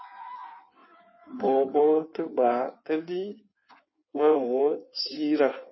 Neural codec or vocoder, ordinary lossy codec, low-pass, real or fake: codec, 16 kHz in and 24 kHz out, 1.1 kbps, FireRedTTS-2 codec; MP3, 24 kbps; 7.2 kHz; fake